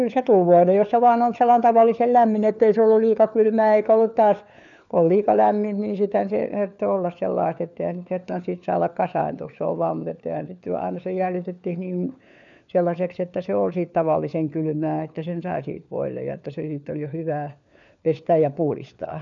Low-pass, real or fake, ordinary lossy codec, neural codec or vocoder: 7.2 kHz; fake; none; codec, 16 kHz, 4 kbps, FunCodec, trained on LibriTTS, 50 frames a second